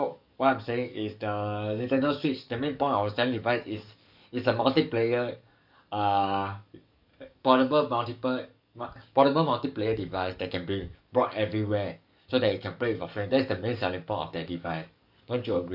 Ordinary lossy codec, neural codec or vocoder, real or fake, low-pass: none; codec, 44.1 kHz, 7.8 kbps, DAC; fake; 5.4 kHz